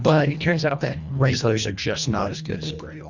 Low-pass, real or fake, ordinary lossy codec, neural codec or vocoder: 7.2 kHz; fake; Opus, 64 kbps; codec, 24 kHz, 1.5 kbps, HILCodec